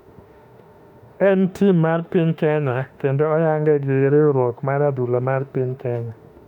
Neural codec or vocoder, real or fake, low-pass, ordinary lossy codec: autoencoder, 48 kHz, 32 numbers a frame, DAC-VAE, trained on Japanese speech; fake; 19.8 kHz; none